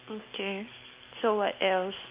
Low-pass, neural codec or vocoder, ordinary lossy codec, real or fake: 3.6 kHz; none; Opus, 24 kbps; real